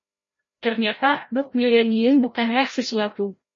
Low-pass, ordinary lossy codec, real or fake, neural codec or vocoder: 7.2 kHz; MP3, 32 kbps; fake; codec, 16 kHz, 0.5 kbps, FreqCodec, larger model